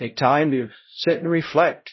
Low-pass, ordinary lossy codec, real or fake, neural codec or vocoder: 7.2 kHz; MP3, 24 kbps; fake; codec, 16 kHz, 0.5 kbps, X-Codec, HuBERT features, trained on LibriSpeech